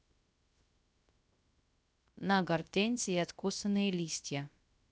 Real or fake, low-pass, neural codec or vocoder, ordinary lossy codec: fake; none; codec, 16 kHz, 0.3 kbps, FocalCodec; none